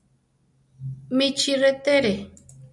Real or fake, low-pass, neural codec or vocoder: real; 10.8 kHz; none